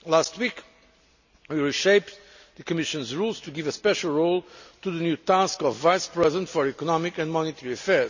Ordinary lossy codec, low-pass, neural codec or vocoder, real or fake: none; 7.2 kHz; none; real